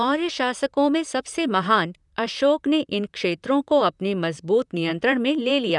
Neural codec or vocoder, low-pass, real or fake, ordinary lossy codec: vocoder, 44.1 kHz, 128 mel bands, Pupu-Vocoder; 10.8 kHz; fake; none